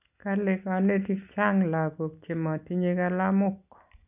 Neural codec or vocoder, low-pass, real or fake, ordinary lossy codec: none; 3.6 kHz; real; none